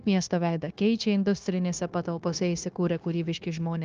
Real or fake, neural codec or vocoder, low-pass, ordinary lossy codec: fake; codec, 16 kHz, 0.9 kbps, LongCat-Audio-Codec; 7.2 kHz; Opus, 32 kbps